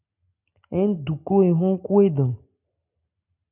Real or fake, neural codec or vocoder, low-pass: real; none; 3.6 kHz